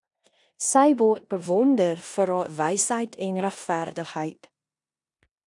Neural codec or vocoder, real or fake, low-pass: codec, 16 kHz in and 24 kHz out, 0.9 kbps, LongCat-Audio-Codec, four codebook decoder; fake; 10.8 kHz